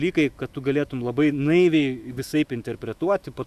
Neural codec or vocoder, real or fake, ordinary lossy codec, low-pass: autoencoder, 48 kHz, 128 numbers a frame, DAC-VAE, trained on Japanese speech; fake; Opus, 64 kbps; 14.4 kHz